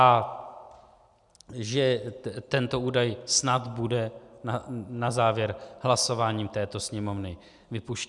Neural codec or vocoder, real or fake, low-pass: none; real; 10.8 kHz